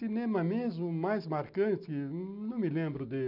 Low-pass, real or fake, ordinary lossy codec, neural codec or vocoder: 5.4 kHz; real; none; none